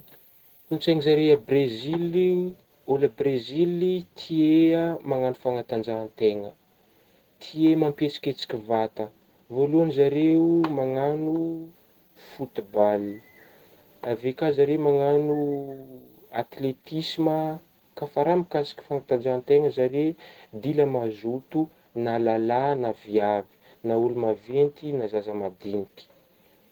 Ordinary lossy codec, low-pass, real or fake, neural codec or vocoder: Opus, 16 kbps; 19.8 kHz; real; none